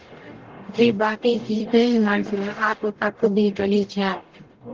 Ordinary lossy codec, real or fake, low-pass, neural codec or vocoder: Opus, 16 kbps; fake; 7.2 kHz; codec, 44.1 kHz, 0.9 kbps, DAC